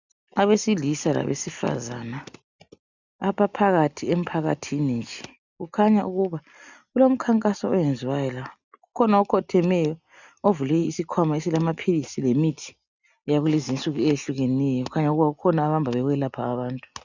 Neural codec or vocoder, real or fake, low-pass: none; real; 7.2 kHz